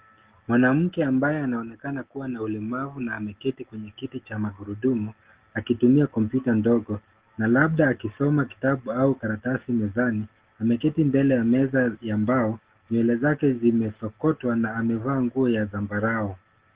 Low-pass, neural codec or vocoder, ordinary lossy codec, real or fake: 3.6 kHz; none; Opus, 16 kbps; real